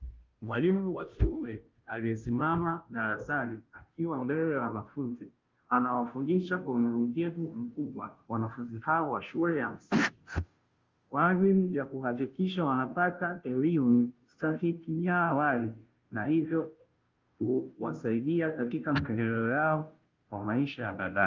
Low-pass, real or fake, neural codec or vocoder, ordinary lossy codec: 7.2 kHz; fake; codec, 16 kHz, 0.5 kbps, FunCodec, trained on Chinese and English, 25 frames a second; Opus, 32 kbps